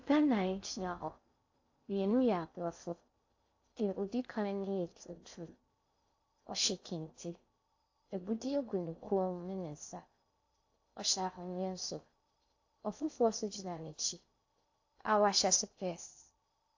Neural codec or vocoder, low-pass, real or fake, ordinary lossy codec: codec, 16 kHz in and 24 kHz out, 0.6 kbps, FocalCodec, streaming, 4096 codes; 7.2 kHz; fake; AAC, 48 kbps